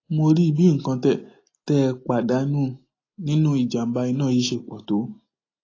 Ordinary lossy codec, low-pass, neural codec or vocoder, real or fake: AAC, 32 kbps; 7.2 kHz; none; real